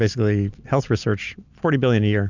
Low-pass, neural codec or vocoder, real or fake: 7.2 kHz; none; real